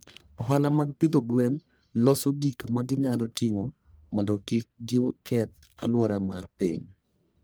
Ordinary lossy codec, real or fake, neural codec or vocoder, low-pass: none; fake; codec, 44.1 kHz, 1.7 kbps, Pupu-Codec; none